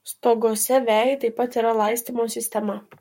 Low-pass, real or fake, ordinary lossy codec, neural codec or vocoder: 19.8 kHz; fake; MP3, 64 kbps; codec, 44.1 kHz, 7.8 kbps, Pupu-Codec